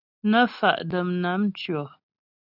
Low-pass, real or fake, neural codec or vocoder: 5.4 kHz; real; none